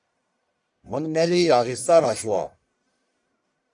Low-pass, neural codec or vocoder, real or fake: 10.8 kHz; codec, 44.1 kHz, 1.7 kbps, Pupu-Codec; fake